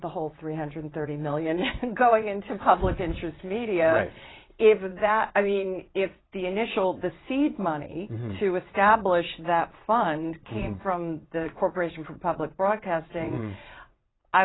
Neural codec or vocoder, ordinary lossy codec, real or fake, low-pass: vocoder, 22.05 kHz, 80 mel bands, WaveNeXt; AAC, 16 kbps; fake; 7.2 kHz